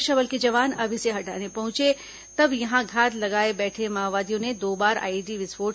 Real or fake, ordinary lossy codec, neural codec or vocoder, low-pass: real; none; none; none